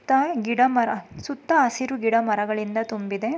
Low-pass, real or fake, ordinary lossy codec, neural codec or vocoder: none; real; none; none